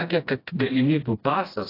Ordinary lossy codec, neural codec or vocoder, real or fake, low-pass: AAC, 24 kbps; codec, 16 kHz, 1 kbps, FreqCodec, smaller model; fake; 5.4 kHz